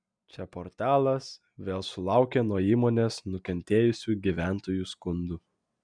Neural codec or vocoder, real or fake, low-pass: none; real; 9.9 kHz